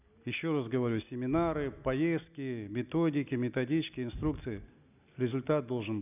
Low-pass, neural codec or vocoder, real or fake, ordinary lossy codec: 3.6 kHz; none; real; none